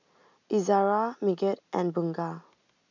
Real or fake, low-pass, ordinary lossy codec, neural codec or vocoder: real; 7.2 kHz; none; none